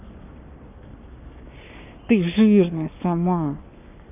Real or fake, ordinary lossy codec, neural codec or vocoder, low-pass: fake; none; codec, 44.1 kHz, 7.8 kbps, Pupu-Codec; 3.6 kHz